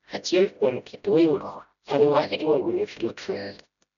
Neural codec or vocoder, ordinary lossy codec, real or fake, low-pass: codec, 16 kHz, 0.5 kbps, FreqCodec, smaller model; none; fake; 7.2 kHz